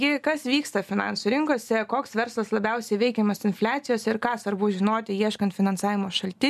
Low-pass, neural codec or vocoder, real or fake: 14.4 kHz; none; real